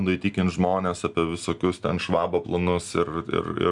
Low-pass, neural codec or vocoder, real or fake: 10.8 kHz; none; real